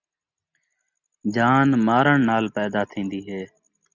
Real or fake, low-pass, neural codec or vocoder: real; 7.2 kHz; none